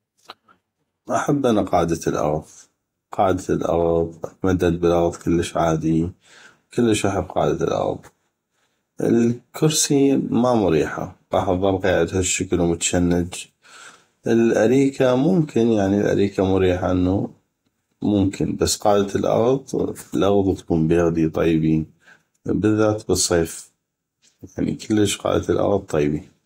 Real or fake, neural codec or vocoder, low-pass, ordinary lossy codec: fake; vocoder, 48 kHz, 128 mel bands, Vocos; 19.8 kHz; AAC, 48 kbps